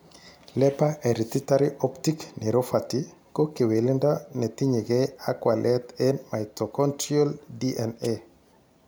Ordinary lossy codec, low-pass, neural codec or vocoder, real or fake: none; none; none; real